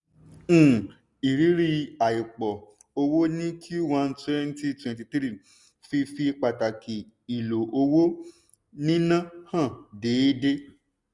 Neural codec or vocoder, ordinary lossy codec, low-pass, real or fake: none; none; 10.8 kHz; real